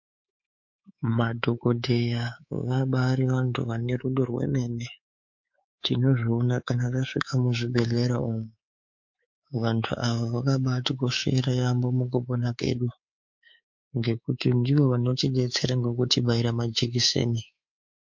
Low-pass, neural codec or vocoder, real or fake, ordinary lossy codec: 7.2 kHz; codec, 24 kHz, 3.1 kbps, DualCodec; fake; MP3, 48 kbps